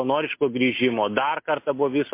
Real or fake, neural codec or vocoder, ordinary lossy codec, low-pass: real; none; MP3, 24 kbps; 3.6 kHz